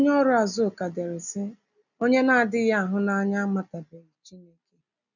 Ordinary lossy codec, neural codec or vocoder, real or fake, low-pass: none; none; real; 7.2 kHz